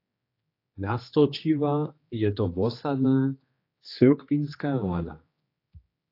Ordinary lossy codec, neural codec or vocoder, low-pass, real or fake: AAC, 32 kbps; codec, 16 kHz, 2 kbps, X-Codec, HuBERT features, trained on general audio; 5.4 kHz; fake